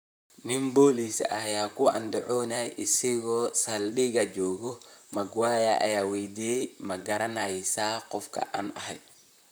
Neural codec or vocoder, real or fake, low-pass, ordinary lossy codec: vocoder, 44.1 kHz, 128 mel bands, Pupu-Vocoder; fake; none; none